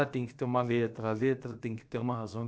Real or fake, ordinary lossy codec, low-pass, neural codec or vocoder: fake; none; none; codec, 16 kHz, about 1 kbps, DyCAST, with the encoder's durations